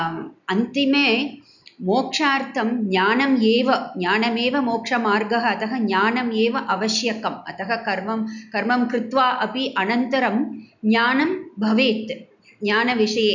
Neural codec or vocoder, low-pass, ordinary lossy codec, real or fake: none; 7.2 kHz; none; real